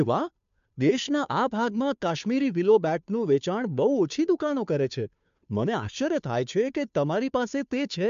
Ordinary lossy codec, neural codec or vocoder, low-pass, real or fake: MP3, 64 kbps; codec, 16 kHz, 2 kbps, FunCodec, trained on Chinese and English, 25 frames a second; 7.2 kHz; fake